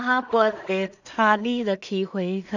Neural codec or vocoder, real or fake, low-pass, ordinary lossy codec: codec, 16 kHz in and 24 kHz out, 0.4 kbps, LongCat-Audio-Codec, two codebook decoder; fake; 7.2 kHz; none